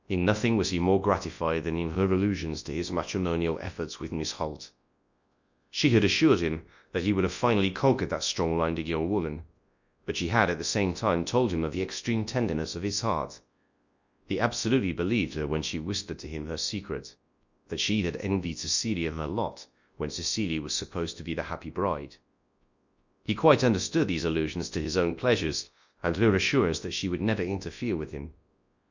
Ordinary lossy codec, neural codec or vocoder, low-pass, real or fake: Opus, 64 kbps; codec, 24 kHz, 0.9 kbps, WavTokenizer, large speech release; 7.2 kHz; fake